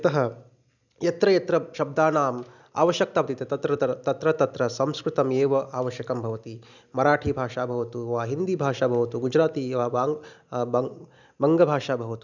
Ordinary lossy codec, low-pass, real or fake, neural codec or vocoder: none; 7.2 kHz; real; none